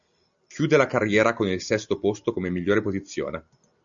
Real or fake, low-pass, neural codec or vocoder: real; 7.2 kHz; none